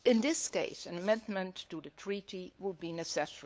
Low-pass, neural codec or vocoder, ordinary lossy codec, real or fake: none; codec, 16 kHz, 8 kbps, FunCodec, trained on LibriTTS, 25 frames a second; none; fake